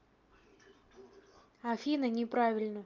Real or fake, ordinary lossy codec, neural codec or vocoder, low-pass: real; Opus, 24 kbps; none; 7.2 kHz